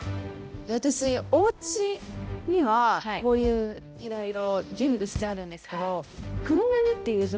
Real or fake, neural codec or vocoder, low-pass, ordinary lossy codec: fake; codec, 16 kHz, 0.5 kbps, X-Codec, HuBERT features, trained on balanced general audio; none; none